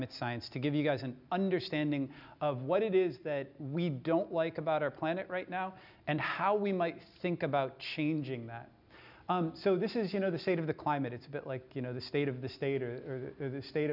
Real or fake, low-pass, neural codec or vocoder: real; 5.4 kHz; none